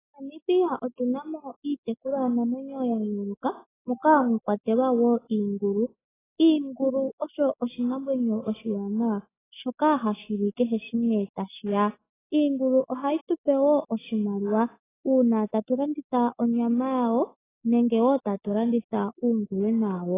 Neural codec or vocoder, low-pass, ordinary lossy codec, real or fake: none; 3.6 kHz; AAC, 16 kbps; real